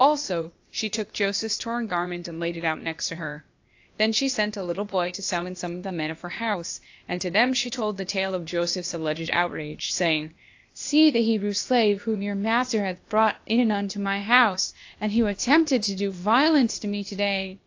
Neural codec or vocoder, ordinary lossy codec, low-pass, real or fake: codec, 16 kHz, 0.8 kbps, ZipCodec; AAC, 48 kbps; 7.2 kHz; fake